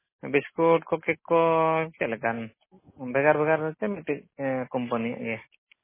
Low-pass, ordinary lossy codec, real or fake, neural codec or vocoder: 3.6 kHz; MP3, 16 kbps; real; none